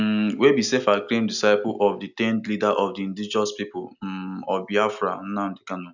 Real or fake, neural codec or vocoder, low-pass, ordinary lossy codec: real; none; 7.2 kHz; none